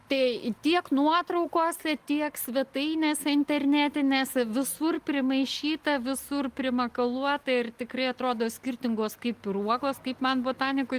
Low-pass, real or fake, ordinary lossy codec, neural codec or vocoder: 14.4 kHz; real; Opus, 32 kbps; none